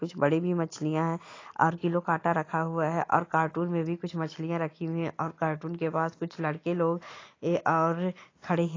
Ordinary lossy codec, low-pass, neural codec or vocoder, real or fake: AAC, 32 kbps; 7.2 kHz; none; real